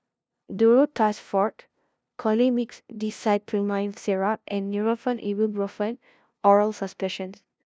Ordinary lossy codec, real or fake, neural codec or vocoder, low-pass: none; fake; codec, 16 kHz, 0.5 kbps, FunCodec, trained on LibriTTS, 25 frames a second; none